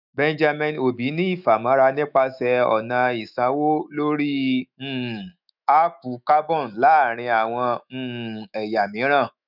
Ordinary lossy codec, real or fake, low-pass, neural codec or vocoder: none; fake; 5.4 kHz; autoencoder, 48 kHz, 128 numbers a frame, DAC-VAE, trained on Japanese speech